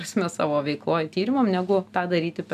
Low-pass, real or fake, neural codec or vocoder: 14.4 kHz; real; none